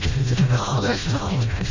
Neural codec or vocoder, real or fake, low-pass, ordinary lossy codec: codec, 16 kHz, 0.5 kbps, FreqCodec, smaller model; fake; 7.2 kHz; MP3, 32 kbps